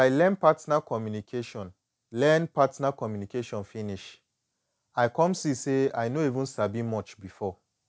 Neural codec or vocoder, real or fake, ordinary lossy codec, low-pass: none; real; none; none